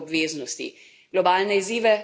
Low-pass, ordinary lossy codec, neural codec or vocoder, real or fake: none; none; none; real